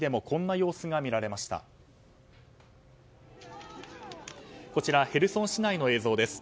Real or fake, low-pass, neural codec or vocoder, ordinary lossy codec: real; none; none; none